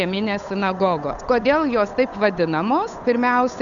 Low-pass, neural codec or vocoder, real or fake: 7.2 kHz; codec, 16 kHz, 8 kbps, FunCodec, trained on Chinese and English, 25 frames a second; fake